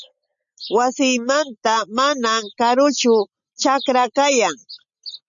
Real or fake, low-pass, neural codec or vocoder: real; 7.2 kHz; none